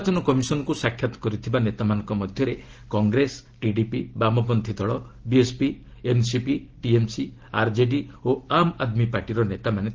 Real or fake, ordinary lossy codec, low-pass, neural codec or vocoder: real; Opus, 16 kbps; 7.2 kHz; none